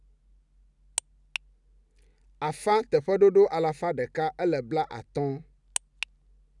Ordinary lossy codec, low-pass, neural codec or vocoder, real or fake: none; 10.8 kHz; none; real